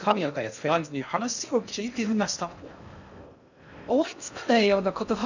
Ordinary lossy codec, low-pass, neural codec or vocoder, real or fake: none; 7.2 kHz; codec, 16 kHz in and 24 kHz out, 0.6 kbps, FocalCodec, streaming, 2048 codes; fake